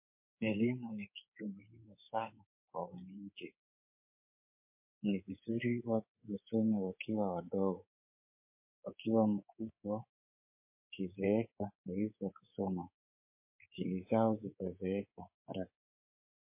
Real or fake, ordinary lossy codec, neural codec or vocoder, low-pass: fake; MP3, 24 kbps; codec, 24 kHz, 3.1 kbps, DualCodec; 3.6 kHz